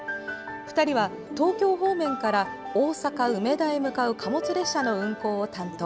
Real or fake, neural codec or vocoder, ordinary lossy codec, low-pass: real; none; none; none